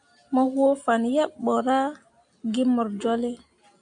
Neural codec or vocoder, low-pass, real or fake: none; 9.9 kHz; real